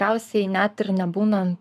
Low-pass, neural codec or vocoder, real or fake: 14.4 kHz; codec, 44.1 kHz, 7.8 kbps, Pupu-Codec; fake